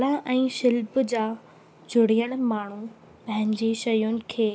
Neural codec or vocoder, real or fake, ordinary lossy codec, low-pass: none; real; none; none